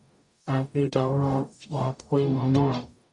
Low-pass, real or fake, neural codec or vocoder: 10.8 kHz; fake; codec, 44.1 kHz, 0.9 kbps, DAC